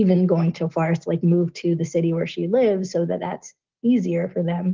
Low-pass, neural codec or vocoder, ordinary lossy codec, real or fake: 7.2 kHz; none; Opus, 16 kbps; real